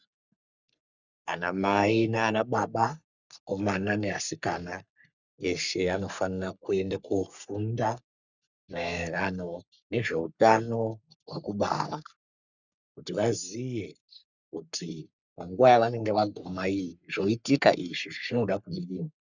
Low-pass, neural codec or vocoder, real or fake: 7.2 kHz; codec, 44.1 kHz, 3.4 kbps, Pupu-Codec; fake